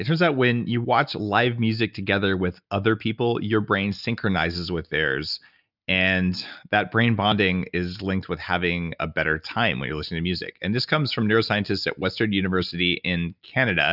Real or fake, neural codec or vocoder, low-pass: real; none; 5.4 kHz